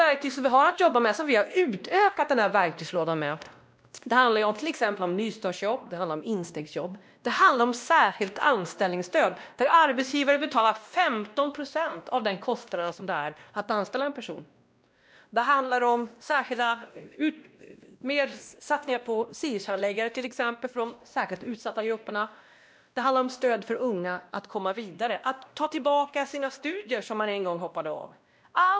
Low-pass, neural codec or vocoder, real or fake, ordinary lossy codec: none; codec, 16 kHz, 1 kbps, X-Codec, WavLM features, trained on Multilingual LibriSpeech; fake; none